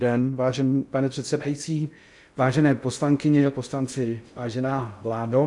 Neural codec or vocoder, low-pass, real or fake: codec, 16 kHz in and 24 kHz out, 0.6 kbps, FocalCodec, streaming, 2048 codes; 10.8 kHz; fake